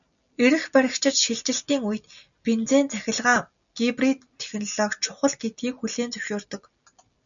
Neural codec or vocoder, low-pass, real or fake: none; 7.2 kHz; real